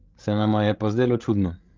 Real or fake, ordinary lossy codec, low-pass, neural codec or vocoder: fake; Opus, 32 kbps; 7.2 kHz; codec, 16 kHz, 16 kbps, FreqCodec, larger model